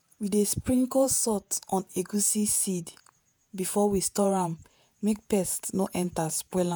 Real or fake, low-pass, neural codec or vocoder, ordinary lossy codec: fake; none; vocoder, 48 kHz, 128 mel bands, Vocos; none